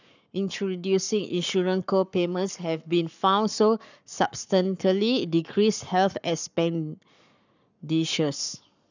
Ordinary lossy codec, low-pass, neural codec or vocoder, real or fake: none; 7.2 kHz; codec, 44.1 kHz, 7.8 kbps, Pupu-Codec; fake